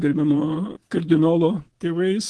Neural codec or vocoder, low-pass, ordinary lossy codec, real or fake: codec, 24 kHz, 3.1 kbps, DualCodec; 10.8 kHz; Opus, 16 kbps; fake